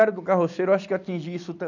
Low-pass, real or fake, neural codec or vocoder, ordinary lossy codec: 7.2 kHz; real; none; none